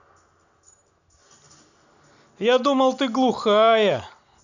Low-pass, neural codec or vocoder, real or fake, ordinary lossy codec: 7.2 kHz; none; real; none